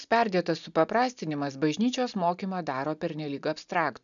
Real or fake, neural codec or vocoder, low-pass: real; none; 7.2 kHz